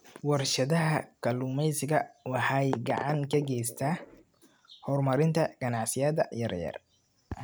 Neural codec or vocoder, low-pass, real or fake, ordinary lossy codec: none; none; real; none